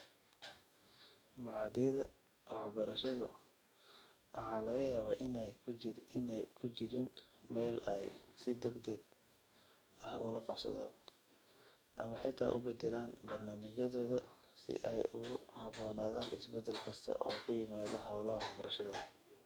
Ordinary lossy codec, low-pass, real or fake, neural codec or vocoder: none; none; fake; codec, 44.1 kHz, 2.6 kbps, DAC